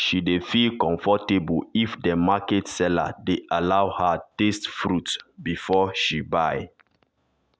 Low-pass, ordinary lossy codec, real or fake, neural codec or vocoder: none; none; real; none